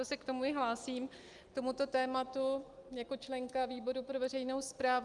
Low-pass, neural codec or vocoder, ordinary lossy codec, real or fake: 10.8 kHz; none; Opus, 32 kbps; real